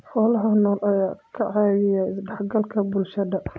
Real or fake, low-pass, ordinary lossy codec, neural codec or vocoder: real; none; none; none